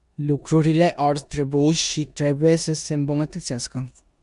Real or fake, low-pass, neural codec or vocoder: fake; 10.8 kHz; codec, 16 kHz in and 24 kHz out, 0.9 kbps, LongCat-Audio-Codec, four codebook decoder